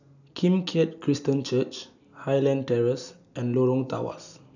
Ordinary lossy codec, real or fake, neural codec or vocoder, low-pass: none; real; none; 7.2 kHz